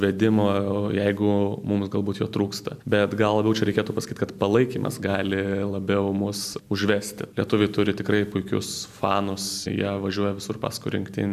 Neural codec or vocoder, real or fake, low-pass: none; real; 14.4 kHz